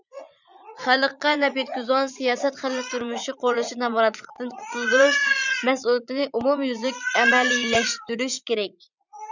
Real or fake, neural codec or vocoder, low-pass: fake; vocoder, 44.1 kHz, 80 mel bands, Vocos; 7.2 kHz